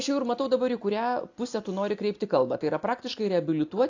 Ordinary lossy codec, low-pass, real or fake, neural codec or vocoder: AAC, 48 kbps; 7.2 kHz; real; none